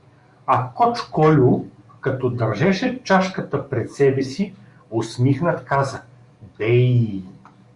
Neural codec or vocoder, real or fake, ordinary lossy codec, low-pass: codec, 44.1 kHz, 7.8 kbps, DAC; fake; Opus, 64 kbps; 10.8 kHz